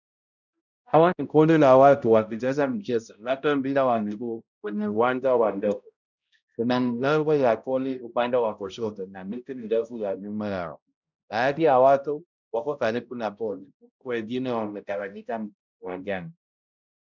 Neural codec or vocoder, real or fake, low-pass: codec, 16 kHz, 0.5 kbps, X-Codec, HuBERT features, trained on balanced general audio; fake; 7.2 kHz